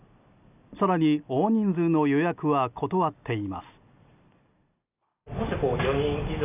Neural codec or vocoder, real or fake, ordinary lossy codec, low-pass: none; real; none; 3.6 kHz